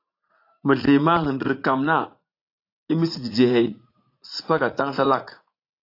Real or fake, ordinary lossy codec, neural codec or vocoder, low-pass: fake; AAC, 32 kbps; vocoder, 44.1 kHz, 80 mel bands, Vocos; 5.4 kHz